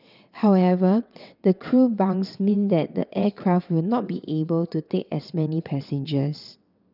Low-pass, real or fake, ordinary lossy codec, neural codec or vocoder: 5.4 kHz; fake; none; vocoder, 22.05 kHz, 80 mel bands, WaveNeXt